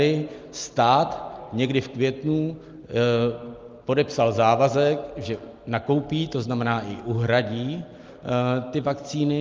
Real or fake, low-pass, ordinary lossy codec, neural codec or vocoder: real; 7.2 kHz; Opus, 32 kbps; none